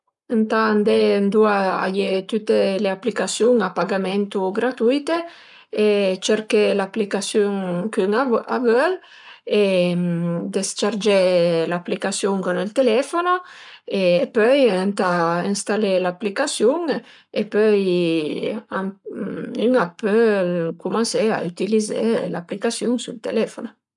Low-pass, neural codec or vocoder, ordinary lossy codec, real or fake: 10.8 kHz; codec, 44.1 kHz, 7.8 kbps, Pupu-Codec; none; fake